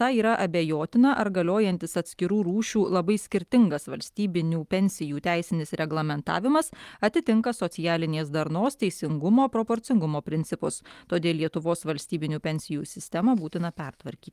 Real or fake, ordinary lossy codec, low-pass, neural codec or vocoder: real; Opus, 32 kbps; 14.4 kHz; none